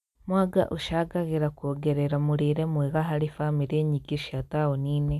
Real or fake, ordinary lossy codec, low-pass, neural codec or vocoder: real; none; 14.4 kHz; none